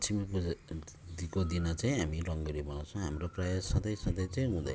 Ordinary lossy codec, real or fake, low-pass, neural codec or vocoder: none; real; none; none